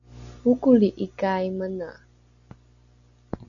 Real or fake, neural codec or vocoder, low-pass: real; none; 7.2 kHz